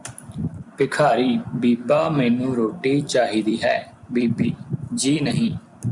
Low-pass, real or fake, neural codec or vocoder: 10.8 kHz; fake; vocoder, 44.1 kHz, 128 mel bands every 256 samples, BigVGAN v2